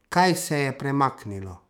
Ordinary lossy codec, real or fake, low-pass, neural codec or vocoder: none; fake; 19.8 kHz; autoencoder, 48 kHz, 128 numbers a frame, DAC-VAE, trained on Japanese speech